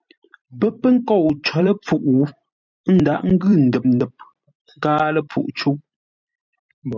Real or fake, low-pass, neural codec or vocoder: fake; 7.2 kHz; vocoder, 44.1 kHz, 128 mel bands every 256 samples, BigVGAN v2